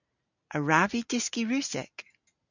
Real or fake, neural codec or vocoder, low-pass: real; none; 7.2 kHz